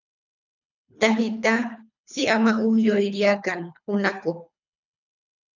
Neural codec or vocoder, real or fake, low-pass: codec, 24 kHz, 3 kbps, HILCodec; fake; 7.2 kHz